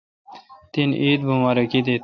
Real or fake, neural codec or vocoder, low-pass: real; none; 7.2 kHz